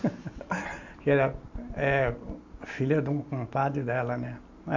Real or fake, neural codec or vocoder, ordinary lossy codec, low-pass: real; none; AAC, 48 kbps; 7.2 kHz